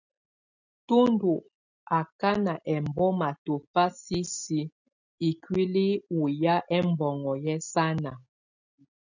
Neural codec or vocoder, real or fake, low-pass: none; real; 7.2 kHz